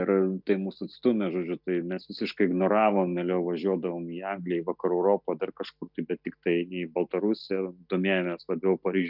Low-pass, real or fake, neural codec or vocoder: 5.4 kHz; real; none